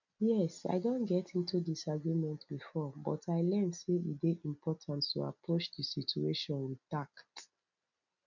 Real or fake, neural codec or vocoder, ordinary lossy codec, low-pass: real; none; none; 7.2 kHz